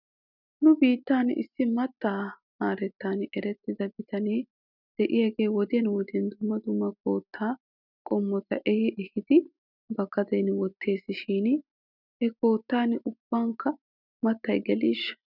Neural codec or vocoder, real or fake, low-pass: none; real; 5.4 kHz